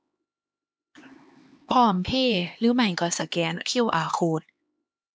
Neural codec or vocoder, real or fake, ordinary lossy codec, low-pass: codec, 16 kHz, 2 kbps, X-Codec, HuBERT features, trained on LibriSpeech; fake; none; none